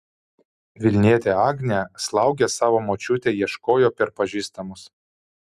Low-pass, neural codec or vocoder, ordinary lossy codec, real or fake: 14.4 kHz; none; Opus, 64 kbps; real